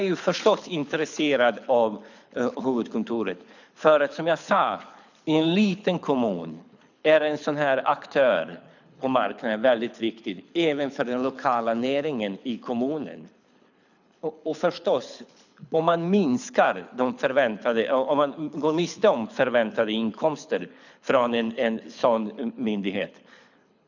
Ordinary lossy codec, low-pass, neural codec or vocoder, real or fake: none; 7.2 kHz; codec, 24 kHz, 6 kbps, HILCodec; fake